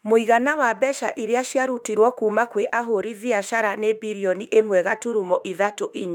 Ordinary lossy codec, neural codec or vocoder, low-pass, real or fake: none; autoencoder, 48 kHz, 32 numbers a frame, DAC-VAE, trained on Japanese speech; 19.8 kHz; fake